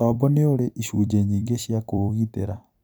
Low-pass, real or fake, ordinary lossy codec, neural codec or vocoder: none; fake; none; vocoder, 44.1 kHz, 128 mel bands every 256 samples, BigVGAN v2